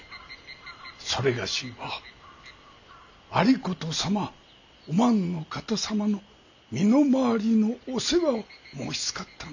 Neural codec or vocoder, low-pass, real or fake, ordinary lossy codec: none; 7.2 kHz; real; none